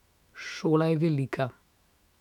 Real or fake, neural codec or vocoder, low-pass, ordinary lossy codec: fake; autoencoder, 48 kHz, 128 numbers a frame, DAC-VAE, trained on Japanese speech; 19.8 kHz; none